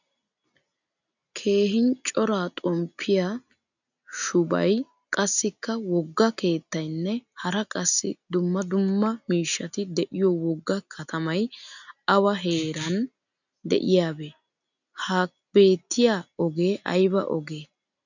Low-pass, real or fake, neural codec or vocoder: 7.2 kHz; real; none